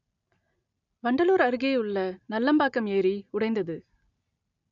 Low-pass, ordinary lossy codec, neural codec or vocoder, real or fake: 7.2 kHz; none; none; real